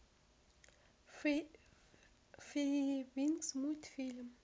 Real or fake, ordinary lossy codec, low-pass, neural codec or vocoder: real; none; none; none